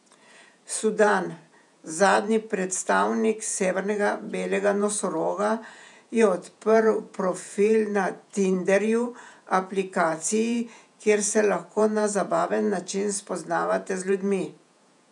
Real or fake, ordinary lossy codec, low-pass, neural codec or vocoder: fake; none; 10.8 kHz; vocoder, 48 kHz, 128 mel bands, Vocos